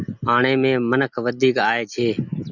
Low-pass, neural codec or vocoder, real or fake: 7.2 kHz; none; real